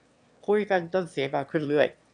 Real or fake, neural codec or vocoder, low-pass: fake; autoencoder, 22.05 kHz, a latent of 192 numbers a frame, VITS, trained on one speaker; 9.9 kHz